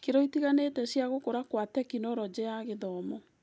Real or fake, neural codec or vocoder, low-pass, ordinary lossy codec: real; none; none; none